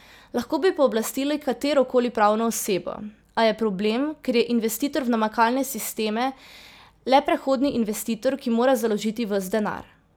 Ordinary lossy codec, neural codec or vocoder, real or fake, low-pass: none; none; real; none